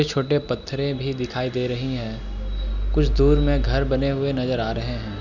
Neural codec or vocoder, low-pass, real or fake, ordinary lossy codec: none; 7.2 kHz; real; none